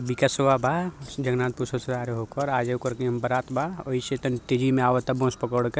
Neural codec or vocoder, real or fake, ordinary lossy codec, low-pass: none; real; none; none